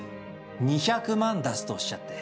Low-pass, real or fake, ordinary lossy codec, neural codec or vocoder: none; real; none; none